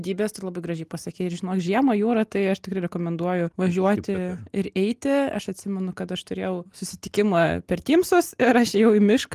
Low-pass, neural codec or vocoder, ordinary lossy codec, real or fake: 14.4 kHz; none; Opus, 24 kbps; real